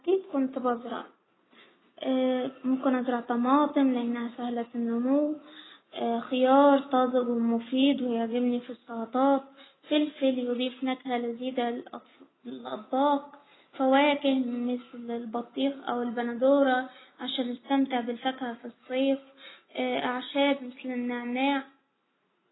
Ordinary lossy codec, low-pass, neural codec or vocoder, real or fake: AAC, 16 kbps; 7.2 kHz; none; real